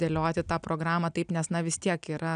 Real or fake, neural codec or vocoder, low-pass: real; none; 9.9 kHz